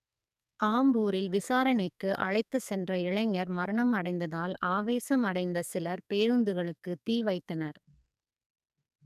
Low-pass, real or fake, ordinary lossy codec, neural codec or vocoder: 14.4 kHz; fake; none; codec, 44.1 kHz, 2.6 kbps, SNAC